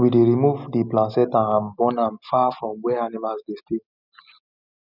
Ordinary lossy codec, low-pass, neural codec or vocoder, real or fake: none; 5.4 kHz; none; real